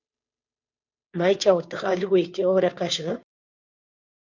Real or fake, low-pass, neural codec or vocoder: fake; 7.2 kHz; codec, 16 kHz, 2 kbps, FunCodec, trained on Chinese and English, 25 frames a second